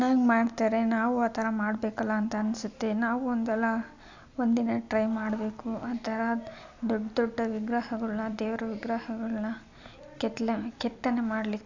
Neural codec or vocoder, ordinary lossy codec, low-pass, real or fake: none; AAC, 48 kbps; 7.2 kHz; real